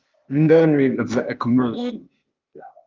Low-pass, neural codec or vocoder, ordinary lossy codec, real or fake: 7.2 kHz; codec, 16 kHz, 0.8 kbps, ZipCodec; Opus, 24 kbps; fake